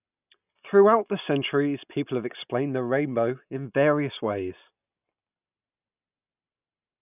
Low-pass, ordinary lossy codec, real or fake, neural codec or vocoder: 3.6 kHz; none; real; none